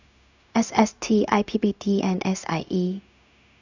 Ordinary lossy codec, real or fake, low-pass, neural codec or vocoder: none; fake; 7.2 kHz; codec, 16 kHz, 0.4 kbps, LongCat-Audio-Codec